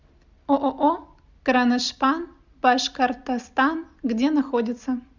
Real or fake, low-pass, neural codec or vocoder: real; 7.2 kHz; none